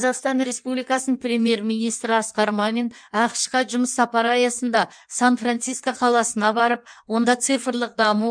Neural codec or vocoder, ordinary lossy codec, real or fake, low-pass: codec, 16 kHz in and 24 kHz out, 1.1 kbps, FireRedTTS-2 codec; MP3, 96 kbps; fake; 9.9 kHz